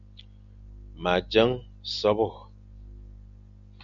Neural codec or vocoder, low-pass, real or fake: none; 7.2 kHz; real